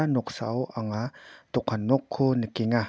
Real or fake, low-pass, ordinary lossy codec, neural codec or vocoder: real; none; none; none